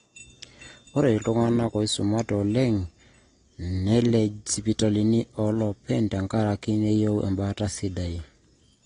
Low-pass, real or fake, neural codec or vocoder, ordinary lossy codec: 9.9 kHz; real; none; AAC, 32 kbps